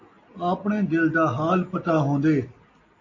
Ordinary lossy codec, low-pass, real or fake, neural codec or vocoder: AAC, 48 kbps; 7.2 kHz; real; none